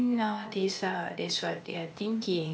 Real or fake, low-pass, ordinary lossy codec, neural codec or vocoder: fake; none; none; codec, 16 kHz, 0.8 kbps, ZipCodec